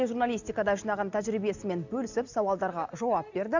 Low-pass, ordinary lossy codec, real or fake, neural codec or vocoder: 7.2 kHz; none; real; none